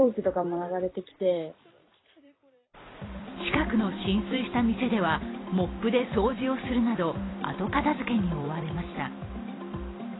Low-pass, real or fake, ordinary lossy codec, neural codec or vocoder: 7.2 kHz; fake; AAC, 16 kbps; vocoder, 44.1 kHz, 128 mel bands every 256 samples, BigVGAN v2